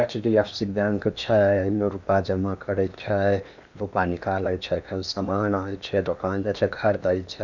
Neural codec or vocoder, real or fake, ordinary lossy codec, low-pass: codec, 16 kHz in and 24 kHz out, 0.8 kbps, FocalCodec, streaming, 65536 codes; fake; none; 7.2 kHz